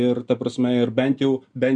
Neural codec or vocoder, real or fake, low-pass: none; real; 9.9 kHz